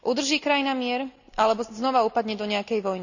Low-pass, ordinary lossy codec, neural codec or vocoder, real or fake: 7.2 kHz; none; none; real